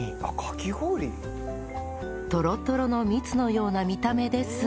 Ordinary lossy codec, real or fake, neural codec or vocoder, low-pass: none; real; none; none